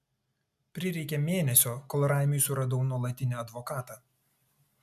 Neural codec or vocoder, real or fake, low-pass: none; real; 14.4 kHz